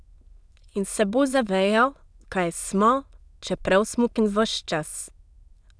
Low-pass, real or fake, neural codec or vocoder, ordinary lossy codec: none; fake; autoencoder, 22.05 kHz, a latent of 192 numbers a frame, VITS, trained on many speakers; none